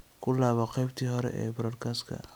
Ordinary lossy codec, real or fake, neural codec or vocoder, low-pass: none; real; none; none